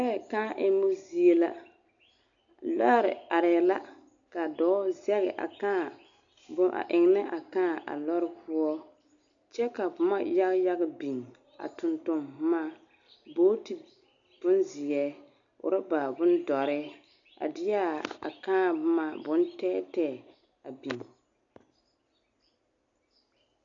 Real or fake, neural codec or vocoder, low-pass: real; none; 7.2 kHz